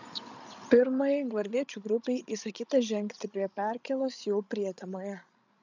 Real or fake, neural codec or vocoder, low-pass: fake; codec, 16 kHz, 16 kbps, FreqCodec, larger model; 7.2 kHz